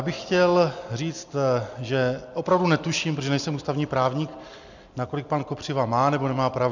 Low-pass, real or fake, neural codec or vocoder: 7.2 kHz; real; none